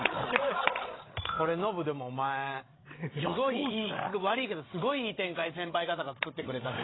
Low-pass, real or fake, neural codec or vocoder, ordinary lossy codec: 7.2 kHz; fake; codec, 16 kHz, 16 kbps, FreqCodec, larger model; AAC, 16 kbps